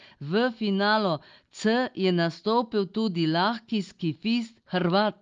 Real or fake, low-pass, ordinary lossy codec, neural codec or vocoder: real; 7.2 kHz; Opus, 32 kbps; none